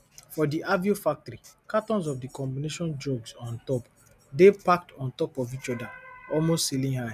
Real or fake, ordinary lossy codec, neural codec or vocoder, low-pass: real; none; none; 14.4 kHz